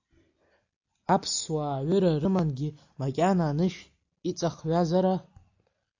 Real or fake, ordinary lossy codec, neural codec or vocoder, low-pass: real; AAC, 48 kbps; none; 7.2 kHz